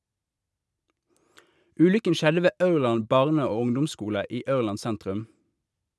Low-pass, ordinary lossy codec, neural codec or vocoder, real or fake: none; none; none; real